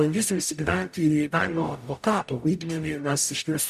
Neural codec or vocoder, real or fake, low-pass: codec, 44.1 kHz, 0.9 kbps, DAC; fake; 14.4 kHz